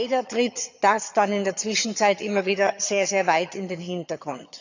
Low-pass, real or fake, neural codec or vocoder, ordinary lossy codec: 7.2 kHz; fake; vocoder, 22.05 kHz, 80 mel bands, HiFi-GAN; none